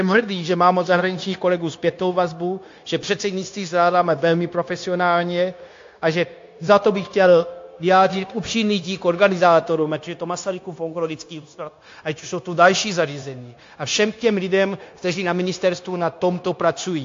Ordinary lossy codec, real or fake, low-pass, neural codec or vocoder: AAC, 48 kbps; fake; 7.2 kHz; codec, 16 kHz, 0.9 kbps, LongCat-Audio-Codec